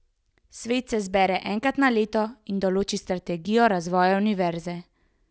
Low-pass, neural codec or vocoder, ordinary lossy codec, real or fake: none; none; none; real